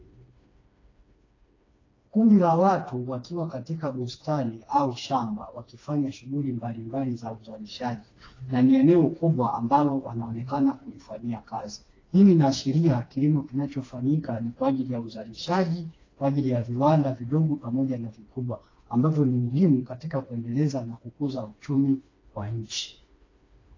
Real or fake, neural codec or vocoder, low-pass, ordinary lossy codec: fake; codec, 16 kHz, 2 kbps, FreqCodec, smaller model; 7.2 kHz; AAC, 32 kbps